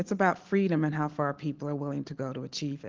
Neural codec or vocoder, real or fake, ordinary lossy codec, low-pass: none; real; Opus, 16 kbps; 7.2 kHz